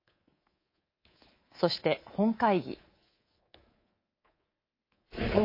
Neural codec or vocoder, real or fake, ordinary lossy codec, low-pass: codec, 24 kHz, 3.1 kbps, DualCodec; fake; MP3, 24 kbps; 5.4 kHz